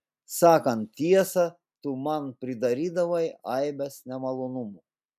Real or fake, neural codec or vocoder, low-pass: real; none; 14.4 kHz